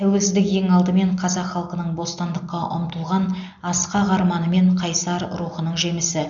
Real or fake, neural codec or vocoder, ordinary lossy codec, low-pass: real; none; none; 7.2 kHz